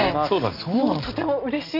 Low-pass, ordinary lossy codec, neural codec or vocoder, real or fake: 5.4 kHz; none; none; real